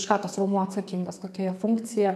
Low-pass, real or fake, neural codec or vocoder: 14.4 kHz; fake; codec, 32 kHz, 1.9 kbps, SNAC